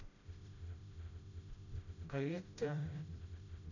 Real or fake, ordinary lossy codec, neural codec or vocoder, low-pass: fake; none; codec, 16 kHz, 0.5 kbps, FreqCodec, smaller model; 7.2 kHz